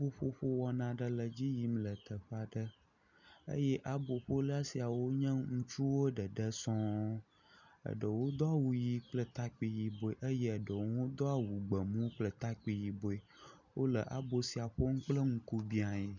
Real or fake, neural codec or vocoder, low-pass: real; none; 7.2 kHz